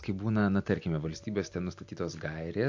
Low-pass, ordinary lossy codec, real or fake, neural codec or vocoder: 7.2 kHz; AAC, 48 kbps; real; none